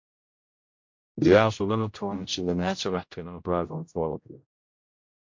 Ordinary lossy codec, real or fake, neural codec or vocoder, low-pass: MP3, 48 kbps; fake; codec, 16 kHz, 0.5 kbps, X-Codec, HuBERT features, trained on general audio; 7.2 kHz